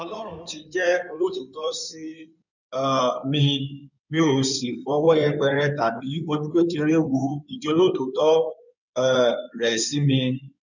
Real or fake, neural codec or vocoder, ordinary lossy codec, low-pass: fake; codec, 16 kHz in and 24 kHz out, 2.2 kbps, FireRedTTS-2 codec; none; 7.2 kHz